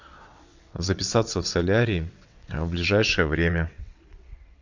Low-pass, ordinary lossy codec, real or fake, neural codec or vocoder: 7.2 kHz; MP3, 64 kbps; fake; vocoder, 22.05 kHz, 80 mel bands, WaveNeXt